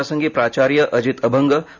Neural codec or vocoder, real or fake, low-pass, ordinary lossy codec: none; real; 7.2 kHz; Opus, 64 kbps